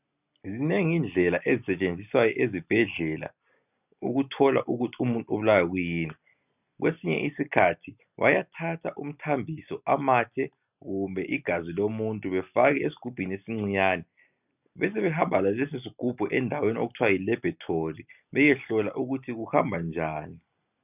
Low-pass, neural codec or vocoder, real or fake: 3.6 kHz; none; real